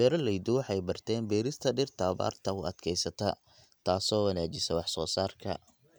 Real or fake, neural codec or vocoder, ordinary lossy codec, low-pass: real; none; none; none